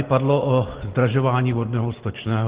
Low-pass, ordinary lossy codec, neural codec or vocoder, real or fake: 3.6 kHz; Opus, 16 kbps; none; real